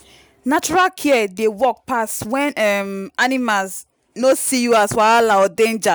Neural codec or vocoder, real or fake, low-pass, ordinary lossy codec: none; real; none; none